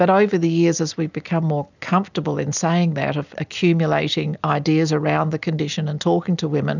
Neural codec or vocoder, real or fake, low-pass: none; real; 7.2 kHz